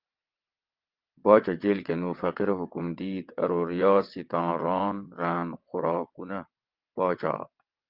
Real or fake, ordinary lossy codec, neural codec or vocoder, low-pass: fake; Opus, 32 kbps; vocoder, 22.05 kHz, 80 mel bands, WaveNeXt; 5.4 kHz